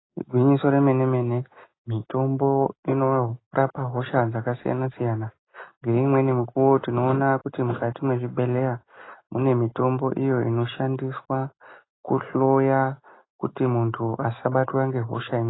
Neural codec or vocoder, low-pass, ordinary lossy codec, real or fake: none; 7.2 kHz; AAC, 16 kbps; real